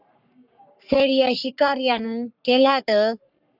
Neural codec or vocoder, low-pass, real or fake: codec, 44.1 kHz, 3.4 kbps, Pupu-Codec; 5.4 kHz; fake